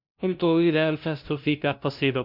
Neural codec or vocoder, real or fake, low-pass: codec, 16 kHz, 0.5 kbps, FunCodec, trained on LibriTTS, 25 frames a second; fake; 5.4 kHz